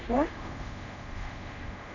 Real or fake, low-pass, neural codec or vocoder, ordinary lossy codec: fake; 7.2 kHz; codec, 24 kHz, 0.5 kbps, DualCodec; none